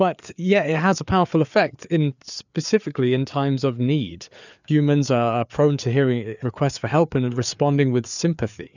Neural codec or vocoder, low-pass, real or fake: codec, 16 kHz, 4 kbps, FreqCodec, larger model; 7.2 kHz; fake